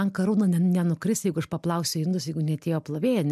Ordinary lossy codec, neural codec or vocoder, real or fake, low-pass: MP3, 96 kbps; none; real; 14.4 kHz